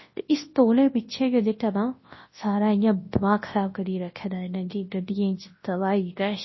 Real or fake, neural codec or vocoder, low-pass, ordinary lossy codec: fake; codec, 24 kHz, 0.9 kbps, WavTokenizer, large speech release; 7.2 kHz; MP3, 24 kbps